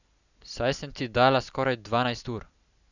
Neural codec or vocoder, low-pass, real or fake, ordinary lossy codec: none; 7.2 kHz; real; none